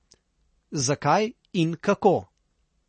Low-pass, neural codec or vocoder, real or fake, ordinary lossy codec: 10.8 kHz; none; real; MP3, 32 kbps